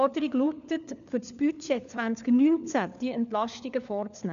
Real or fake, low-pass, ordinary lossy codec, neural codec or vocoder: fake; 7.2 kHz; none; codec, 16 kHz, 4 kbps, FunCodec, trained on LibriTTS, 50 frames a second